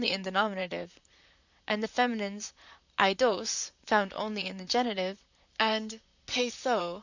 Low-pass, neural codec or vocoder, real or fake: 7.2 kHz; vocoder, 44.1 kHz, 128 mel bands, Pupu-Vocoder; fake